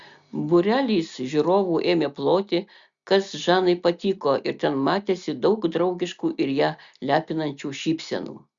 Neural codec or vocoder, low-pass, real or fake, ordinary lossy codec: none; 7.2 kHz; real; Opus, 64 kbps